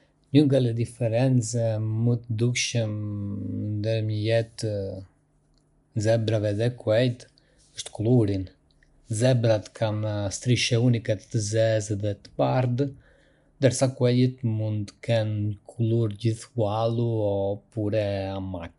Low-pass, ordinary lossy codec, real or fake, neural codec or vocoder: 10.8 kHz; none; real; none